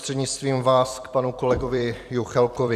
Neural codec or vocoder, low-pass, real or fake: vocoder, 44.1 kHz, 128 mel bands every 256 samples, BigVGAN v2; 14.4 kHz; fake